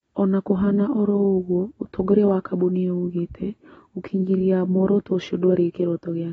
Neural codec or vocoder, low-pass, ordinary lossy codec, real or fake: none; 19.8 kHz; AAC, 24 kbps; real